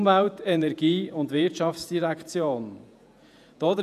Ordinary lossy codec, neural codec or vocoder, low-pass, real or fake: none; vocoder, 48 kHz, 128 mel bands, Vocos; 14.4 kHz; fake